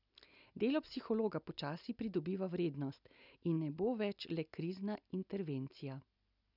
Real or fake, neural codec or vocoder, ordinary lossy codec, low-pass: real; none; none; 5.4 kHz